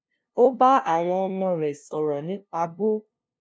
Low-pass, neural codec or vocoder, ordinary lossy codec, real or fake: none; codec, 16 kHz, 0.5 kbps, FunCodec, trained on LibriTTS, 25 frames a second; none; fake